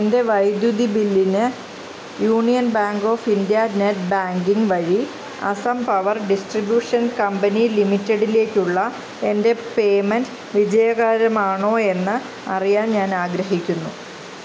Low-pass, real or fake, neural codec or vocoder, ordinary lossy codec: none; real; none; none